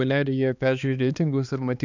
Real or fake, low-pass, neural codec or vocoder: fake; 7.2 kHz; codec, 16 kHz, 2 kbps, X-Codec, HuBERT features, trained on balanced general audio